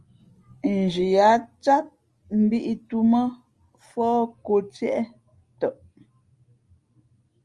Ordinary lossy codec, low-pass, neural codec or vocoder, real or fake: Opus, 32 kbps; 10.8 kHz; none; real